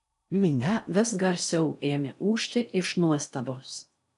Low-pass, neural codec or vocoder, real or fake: 10.8 kHz; codec, 16 kHz in and 24 kHz out, 0.6 kbps, FocalCodec, streaming, 4096 codes; fake